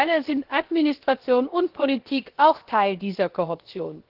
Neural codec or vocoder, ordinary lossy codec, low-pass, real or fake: codec, 16 kHz, 0.7 kbps, FocalCodec; Opus, 16 kbps; 5.4 kHz; fake